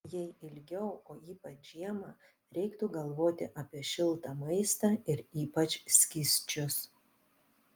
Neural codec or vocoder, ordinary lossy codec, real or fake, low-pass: none; Opus, 32 kbps; real; 19.8 kHz